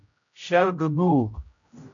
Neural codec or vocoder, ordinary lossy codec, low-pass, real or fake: codec, 16 kHz, 0.5 kbps, X-Codec, HuBERT features, trained on general audio; MP3, 64 kbps; 7.2 kHz; fake